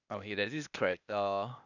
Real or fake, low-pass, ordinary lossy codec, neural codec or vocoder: fake; 7.2 kHz; none; codec, 16 kHz, 0.8 kbps, ZipCodec